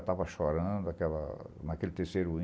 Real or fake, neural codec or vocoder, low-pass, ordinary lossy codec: real; none; none; none